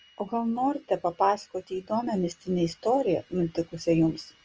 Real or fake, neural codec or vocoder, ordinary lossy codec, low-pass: real; none; Opus, 24 kbps; 7.2 kHz